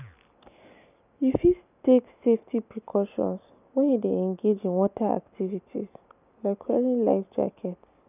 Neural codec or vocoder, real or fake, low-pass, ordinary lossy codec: none; real; 3.6 kHz; none